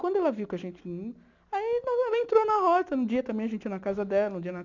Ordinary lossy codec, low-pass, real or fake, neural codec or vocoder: none; 7.2 kHz; real; none